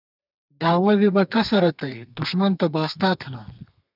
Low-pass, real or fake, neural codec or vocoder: 5.4 kHz; fake; codec, 32 kHz, 1.9 kbps, SNAC